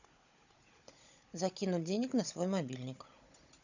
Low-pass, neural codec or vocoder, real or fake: 7.2 kHz; codec, 16 kHz, 16 kbps, FunCodec, trained on Chinese and English, 50 frames a second; fake